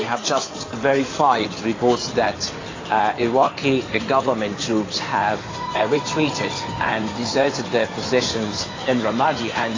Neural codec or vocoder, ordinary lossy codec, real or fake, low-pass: codec, 16 kHz in and 24 kHz out, 2.2 kbps, FireRedTTS-2 codec; AAC, 32 kbps; fake; 7.2 kHz